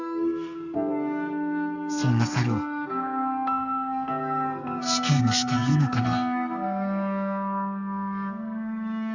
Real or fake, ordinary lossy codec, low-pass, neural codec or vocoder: fake; Opus, 64 kbps; 7.2 kHz; codec, 32 kHz, 1.9 kbps, SNAC